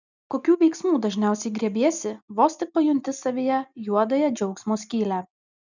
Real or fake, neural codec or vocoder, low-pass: real; none; 7.2 kHz